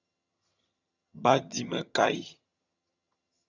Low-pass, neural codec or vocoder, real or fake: 7.2 kHz; vocoder, 22.05 kHz, 80 mel bands, HiFi-GAN; fake